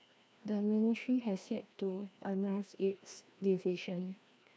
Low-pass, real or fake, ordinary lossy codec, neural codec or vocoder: none; fake; none; codec, 16 kHz, 1 kbps, FreqCodec, larger model